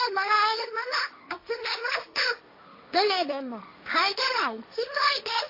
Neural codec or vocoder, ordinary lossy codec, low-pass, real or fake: codec, 16 kHz, 1.1 kbps, Voila-Tokenizer; AAC, 32 kbps; 5.4 kHz; fake